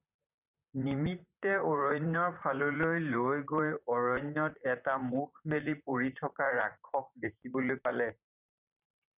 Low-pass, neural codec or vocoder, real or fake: 3.6 kHz; vocoder, 44.1 kHz, 128 mel bands, Pupu-Vocoder; fake